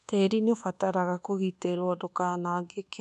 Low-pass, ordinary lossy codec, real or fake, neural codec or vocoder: 10.8 kHz; none; fake; codec, 24 kHz, 1.2 kbps, DualCodec